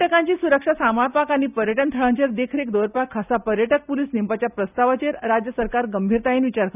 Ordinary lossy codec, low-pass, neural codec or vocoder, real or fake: none; 3.6 kHz; none; real